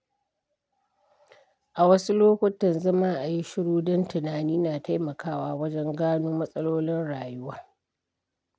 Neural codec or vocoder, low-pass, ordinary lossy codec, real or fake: none; none; none; real